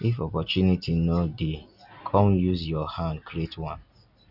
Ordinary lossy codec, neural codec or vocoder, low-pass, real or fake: none; none; 5.4 kHz; real